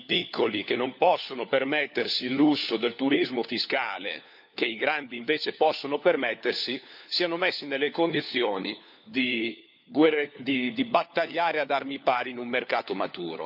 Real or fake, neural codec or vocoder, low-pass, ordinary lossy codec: fake; codec, 16 kHz, 4 kbps, FunCodec, trained on LibriTTS, 50 frames a second; 5.4 kHz; none